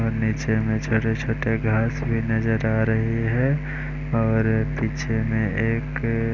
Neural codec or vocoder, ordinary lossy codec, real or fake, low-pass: none; none; real; 7.2 kHz